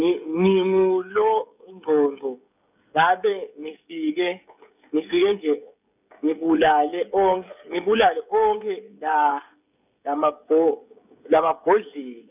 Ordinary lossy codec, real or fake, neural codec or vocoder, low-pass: none; fake; codec, 16 kHz, 16 kbps, FreqCodec, smaller model; 3.6 kHz